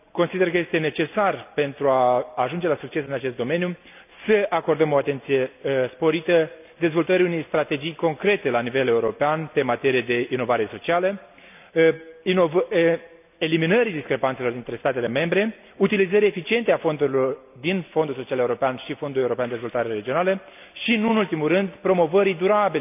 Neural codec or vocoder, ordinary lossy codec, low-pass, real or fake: none; none; 3.6 kHz; real